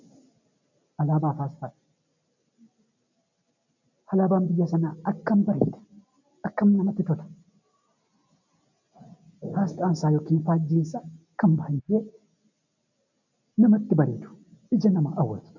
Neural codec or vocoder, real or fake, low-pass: none; real; 7.2 kHz